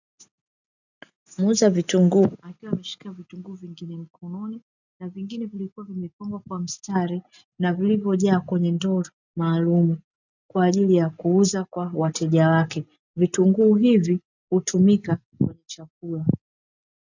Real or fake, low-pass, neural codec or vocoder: real; 7.2 kHz; none